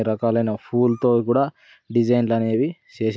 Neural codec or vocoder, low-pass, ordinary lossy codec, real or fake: none; none; none; real